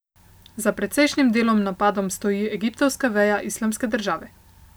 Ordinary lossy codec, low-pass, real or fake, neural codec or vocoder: none; none; real; none